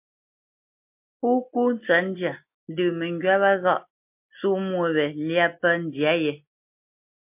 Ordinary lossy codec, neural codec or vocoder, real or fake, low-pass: MP3, 32 kbps; none; real; 3.6 kHz